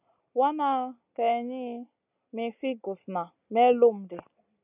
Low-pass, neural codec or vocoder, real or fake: 3.6 kHz; none; real